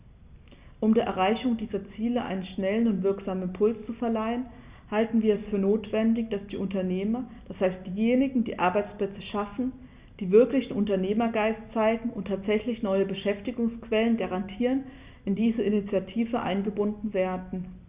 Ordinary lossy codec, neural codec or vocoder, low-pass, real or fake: Opus, 64 kbps; none; 3.6 kHz; real